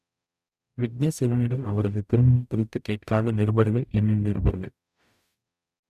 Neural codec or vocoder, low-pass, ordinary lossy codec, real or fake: codec, 44.1 kHz, 0.9 kbps, DAC; 14.4 kHz; none; fake